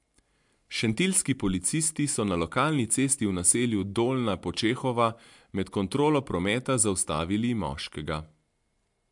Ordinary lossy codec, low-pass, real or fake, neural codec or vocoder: MP3, 64 kbps; 10.8 kHz; real; none